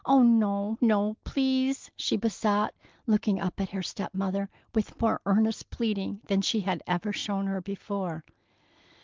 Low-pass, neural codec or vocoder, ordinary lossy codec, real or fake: 7.2 kHz; none; Opus, 32 kbps; real